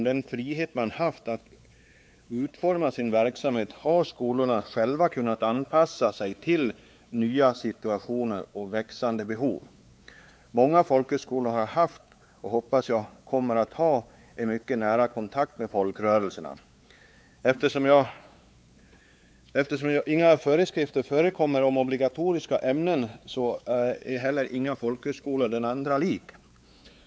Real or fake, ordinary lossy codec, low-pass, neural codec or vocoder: fake; none; none; codec, 16 kHz, 4 kbps, X-Codec, WavLM features, trained on Multilingual LibriSpeech